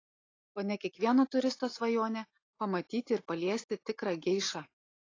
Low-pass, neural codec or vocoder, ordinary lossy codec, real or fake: 7.2 kHz; none; AAC, 32 kbps; real